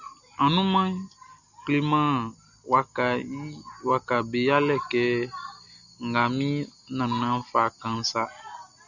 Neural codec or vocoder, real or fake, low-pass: none; real; 7.2 kHz